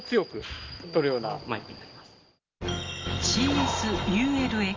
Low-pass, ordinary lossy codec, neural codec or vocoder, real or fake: 7.2 kHz; Opus, 32 kbps; none; real